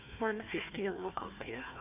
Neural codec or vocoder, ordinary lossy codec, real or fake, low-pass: codec, 16 kHz, 1 kbps, FunCodec, trained on Chinese and English, 50 frames a second; AAC, 32 kbps; fake; 3.6 kHz